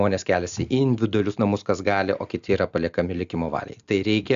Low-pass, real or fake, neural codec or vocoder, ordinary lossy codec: 7.2 kHz; real; none; AAC, 96 kbps